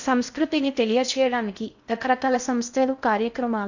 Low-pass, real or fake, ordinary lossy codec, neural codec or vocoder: 7.2 kHz; fake; none; codec, 16 kHz in and 24 kHz out, 0.6 kbps, FocalCodec, streaming, 4096 codes